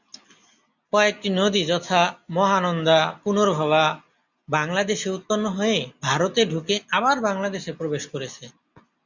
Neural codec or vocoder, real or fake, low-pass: none; real; 7.2 kHz